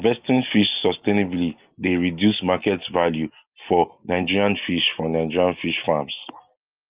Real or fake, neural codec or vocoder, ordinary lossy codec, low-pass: real; none; Opus, 32 kbps; 3.6 kHz